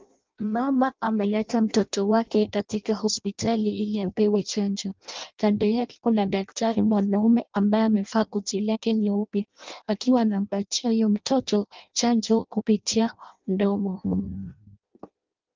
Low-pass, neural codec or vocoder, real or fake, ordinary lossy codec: 7.2 kHz; codec, 16 kHz in and 24 kHz out, 0.6 kbps, FireRedTTS-2 codec; fake; Opus, 24 kbps